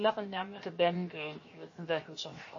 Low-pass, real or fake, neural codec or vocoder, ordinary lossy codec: 7.2 kHz; fake; codec, 16 kHz, 0.7 kbps, FocalCodec; MP3, 32 kbps